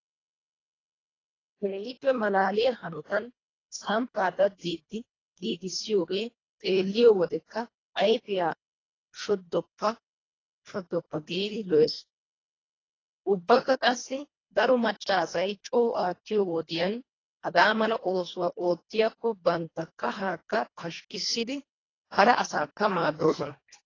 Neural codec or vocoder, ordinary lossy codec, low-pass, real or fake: codec, 24 kHz, 1.5 kbps, HILCodec; AAC, 32 kbps; 7.2 kHz; fake